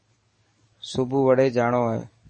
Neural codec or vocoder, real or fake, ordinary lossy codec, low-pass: codec, 44.1 kHz, 7.8 kbps, DAC; fake; MP3, 32 kbps; 10.8 kHz